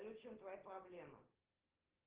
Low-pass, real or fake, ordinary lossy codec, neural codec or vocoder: 3.6 kHz; real; Opus, 16 kbps; none